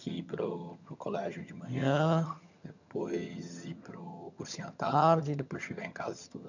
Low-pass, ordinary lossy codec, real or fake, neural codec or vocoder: 7.2 kHz; none; fake; vocoder, 22.05 kHz, 80 mel bands, HiFi-GAN